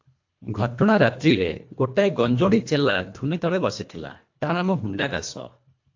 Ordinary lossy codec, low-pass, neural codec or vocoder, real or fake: AAC, 48 kbps; 7.2 kHz; codec, 24 kHz, 1.5 kbps, HILCodec; fake